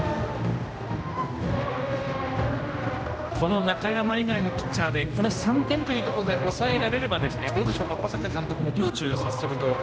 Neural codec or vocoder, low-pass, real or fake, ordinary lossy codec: codec, 16 kHz, 1 kbps, X-Codec, HuBERT features, trained on general audio; none; fake; none